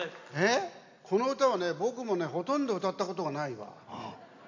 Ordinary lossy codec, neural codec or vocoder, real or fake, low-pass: AAC, 48 kbps; none; real; 7.2 kHz